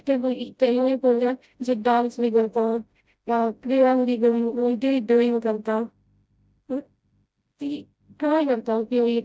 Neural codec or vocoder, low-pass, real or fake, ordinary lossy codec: codec, 16 kHz, 0.5 kbps, FreqCodec, smaller model; none; fake; none